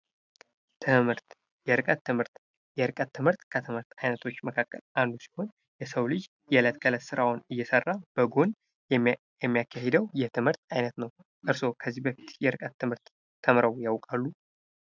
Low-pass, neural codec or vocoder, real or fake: 7.2 kHz; none; real